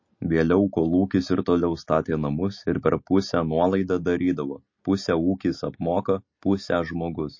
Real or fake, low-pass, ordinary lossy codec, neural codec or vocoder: real; 7.2 kHz; MP3, 32 kbps; none